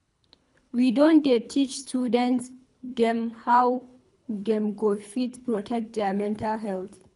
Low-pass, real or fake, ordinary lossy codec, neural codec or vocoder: 10.8 kHz; fake; none; codec, 24 kHz, 3 kbps, HILCodec